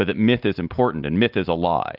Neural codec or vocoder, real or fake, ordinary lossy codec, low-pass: none; real; Opus, 24 kbps; 5.4 kHz